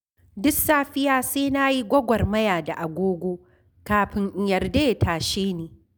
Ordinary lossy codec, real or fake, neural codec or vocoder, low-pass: none; real; none; none